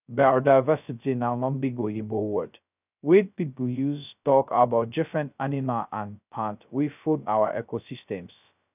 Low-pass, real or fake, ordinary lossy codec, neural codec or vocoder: 3.6 kHz; fake; none; codec, 16 kHz, 0.2 kbps, FocalCodec